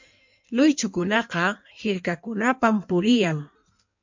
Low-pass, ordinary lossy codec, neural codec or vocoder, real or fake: 7.2 kHz; MP3, 64 kbps; codec, 16 kHz in and 24 kHz out, 1.1 kbps, FireRedTTS-2 codec; fake